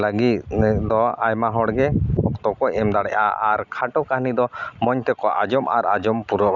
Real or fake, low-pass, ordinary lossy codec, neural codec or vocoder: real; 7.2 kHz; none; none